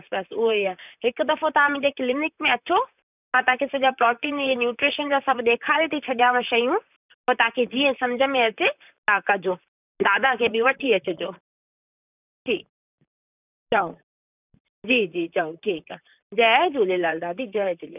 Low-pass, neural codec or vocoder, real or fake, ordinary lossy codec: 3.6 kHz; vocoder, 44.1 kHz, 128 mel bands, Pupu-Vocoder; fake; none